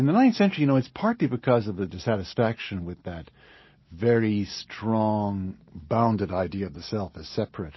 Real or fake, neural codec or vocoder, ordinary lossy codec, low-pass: real; none; MP3, 24 kbps; 7.2 kHz